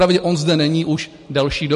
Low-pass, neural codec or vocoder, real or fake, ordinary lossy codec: 14.4 kHz; none; real; MP3, 48 kbps